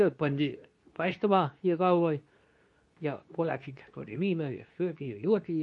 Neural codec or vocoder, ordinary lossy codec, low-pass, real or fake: codec, 24 kHz, 0.9 kbps, WavTokenizer, medium speech release version 2; MP3, 48 kbps; 10.8 kHz; fake